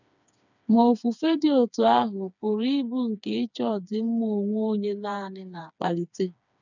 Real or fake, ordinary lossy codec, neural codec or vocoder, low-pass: fake; none; codec, 16 kHz, 4 kbps, FreqCodec, smaller model; 7.2 kHz